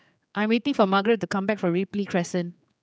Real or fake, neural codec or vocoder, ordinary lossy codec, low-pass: fake; codec, 16 kHz, 4 kbps, X-Codec, HuBERT features, trained on general audio; none; none